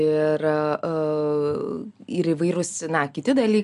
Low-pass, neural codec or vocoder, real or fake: 10.8 kHz; none; real